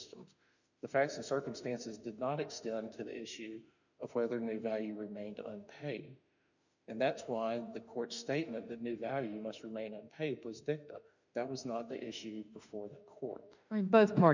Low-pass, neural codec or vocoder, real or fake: 7.2 kHz; autoencoder, 48 kHz, 32 numbers a frame, DAC-VAE, trained on Japanese speech; fake